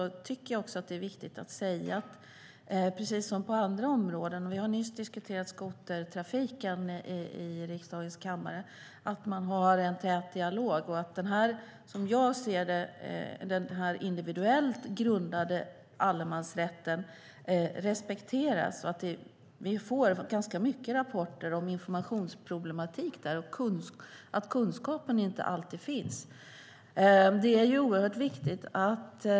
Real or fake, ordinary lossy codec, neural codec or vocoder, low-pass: real; none; none; none